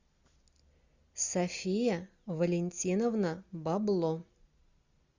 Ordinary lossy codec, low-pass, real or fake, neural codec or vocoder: Opus, 64 kbps; 7.2 kHz; real; none